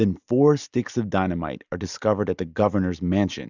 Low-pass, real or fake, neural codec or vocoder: 7.2 kHz; real; none